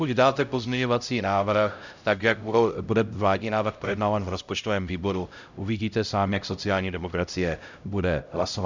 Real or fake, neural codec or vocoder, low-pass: fake; codec, 16 kHz, 0.5 kbps, X-Codec, HuBERT features, trained on LibriSpeech; 7.2 kHz